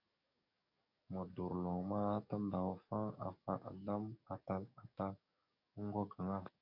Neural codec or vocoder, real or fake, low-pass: codec, 44.1 kHz, 7.8 kbps, DAC; fake; 5.4 kHz